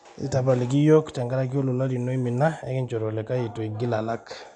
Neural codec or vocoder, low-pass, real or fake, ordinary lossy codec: none; 10.8 kHz; real; none